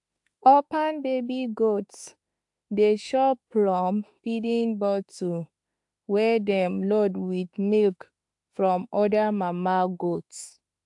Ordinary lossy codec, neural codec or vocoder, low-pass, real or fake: AAC, 64 kbps; autoencoder, 48 kHz, 32 numbers a frame, DAC-VAE, trained on Japanese speech; 10.8 kHz; fake